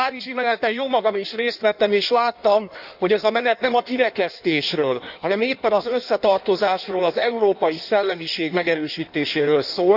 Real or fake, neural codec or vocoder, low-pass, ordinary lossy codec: fake; codec, 16 kHz in and 24 kHz out, 1.1 kbps, FireRedTTS-2 codec; 5.4 kHz; none